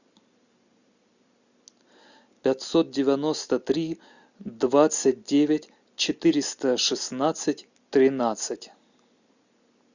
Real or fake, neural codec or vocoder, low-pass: real; none; 7.2 kHz